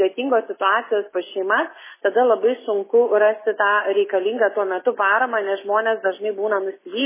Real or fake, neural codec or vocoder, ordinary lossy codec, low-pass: real; none; MP3, 16 kbps; 3.6 kHz